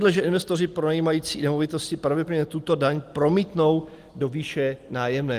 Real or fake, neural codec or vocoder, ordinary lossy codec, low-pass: real; none; Opus, 24 kbps; 14.4 kHz